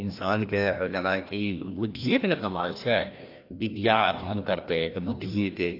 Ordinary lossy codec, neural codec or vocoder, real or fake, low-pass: AAC, 32 kbps; codec, 16 kHz, 1 kbps, FreqCodec, larger model; fake; 5.4 kHz